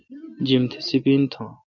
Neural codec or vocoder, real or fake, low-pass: none; real; 7.2 kHz